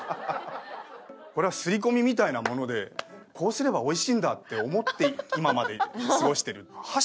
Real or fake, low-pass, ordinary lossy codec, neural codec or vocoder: real; none; none; none